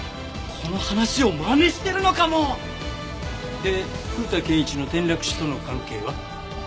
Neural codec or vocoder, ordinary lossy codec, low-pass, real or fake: none; none; none; real